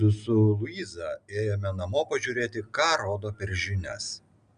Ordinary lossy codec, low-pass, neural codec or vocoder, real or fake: Opus, 64 kbps; 9.9 kHz; none; real